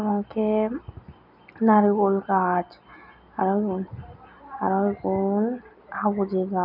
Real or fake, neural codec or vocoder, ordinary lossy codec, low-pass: real; none; none; 5.4 kHz